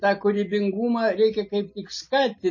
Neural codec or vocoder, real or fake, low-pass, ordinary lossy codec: none; real; 7.2 kHz; MP3, 32 kbps